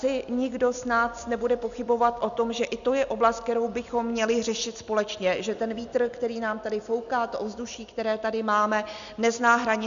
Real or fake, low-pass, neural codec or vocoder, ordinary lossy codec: real; 7.2 kHz; none; MP3, 96 kbps